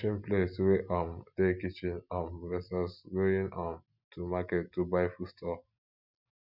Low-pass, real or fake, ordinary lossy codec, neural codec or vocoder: 5.4 kHz; real; none; none